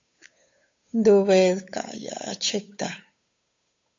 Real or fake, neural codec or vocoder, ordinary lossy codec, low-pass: fake; codec, 16 kHz, 8 kbps, FunCodec, trained on Chinese and English, 25 frames a second; AAC, 48 kbps; 7.2 kHz